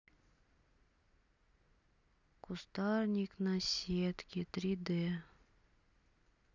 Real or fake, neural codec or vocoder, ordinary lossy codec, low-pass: real; none; none; 7.2 kHz